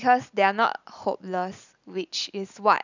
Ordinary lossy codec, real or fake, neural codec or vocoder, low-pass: none; real; none; 7.2 kHz